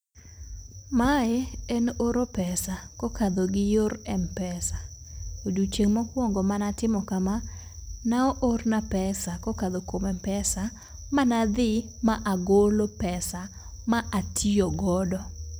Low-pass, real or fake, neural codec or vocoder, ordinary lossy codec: none; real; none; none